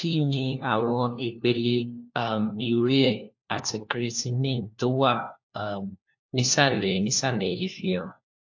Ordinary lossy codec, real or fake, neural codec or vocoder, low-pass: AAC, 48 kbps; fake; codec, 16 kHz, 1 kbps, FunCodec, trained on LibriTTS, 50 frames a second; 7.2 kHz